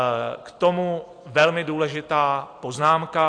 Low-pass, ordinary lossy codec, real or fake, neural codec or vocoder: 9.9 kHz; AAC, 48 kbps; real; none